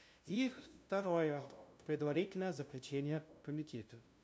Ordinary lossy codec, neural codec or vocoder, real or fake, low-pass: none; codec, 16 kHz, 0.5 kbps, FunCodec, trained on LibriTTS, 25 frames a second; fake; none